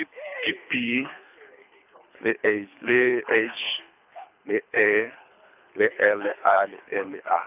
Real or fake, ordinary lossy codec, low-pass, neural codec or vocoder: fake; none; 3.6 kHz; codec, 24 kHz, 3 kbps, HILCodec